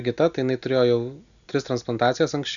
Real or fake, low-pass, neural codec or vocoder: real; 7.2 kHz; none